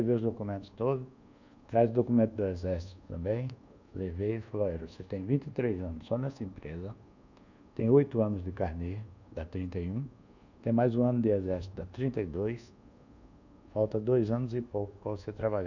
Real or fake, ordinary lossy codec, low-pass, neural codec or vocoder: fake; none; 7.2 kHz; codec, 24 kHz, 1.2 kbps, DualCodec